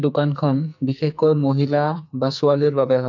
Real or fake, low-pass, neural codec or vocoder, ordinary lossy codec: fake; 7.2 kHz; codec, 44.1 kHz, 2.6 kbps, SNAC; none